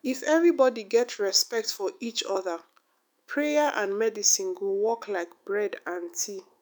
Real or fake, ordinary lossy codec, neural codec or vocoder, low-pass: fake; none; autoencoder, 48 kHz, 128 numbers a frame, DAC-VAE, trained on Japanese speech; none